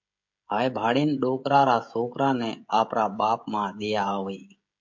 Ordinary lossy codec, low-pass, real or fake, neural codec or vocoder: MP3, 48 kbps; 7.2 kHz; fake; codec, 16 kHz, 16 kbps, FreqCodec, smaller model